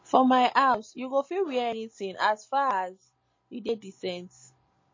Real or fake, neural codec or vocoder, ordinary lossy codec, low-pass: real; none; MP3, 32 kbps; 7.2 kHz